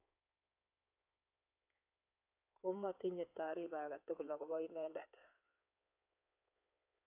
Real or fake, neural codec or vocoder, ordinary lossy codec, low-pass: fake; codec, 16 kHz in and 24 kHz out, 2.2 kbps, FireRedTTS-2 codec; AAC, 32 kbps; 3.6 kHz